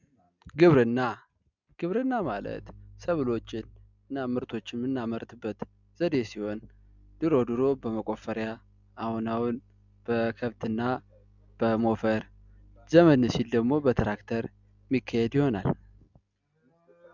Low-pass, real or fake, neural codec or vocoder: 7.2 kHz; real; none